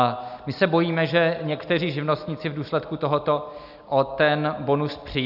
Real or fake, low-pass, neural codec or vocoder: real; 5.4 kHz; none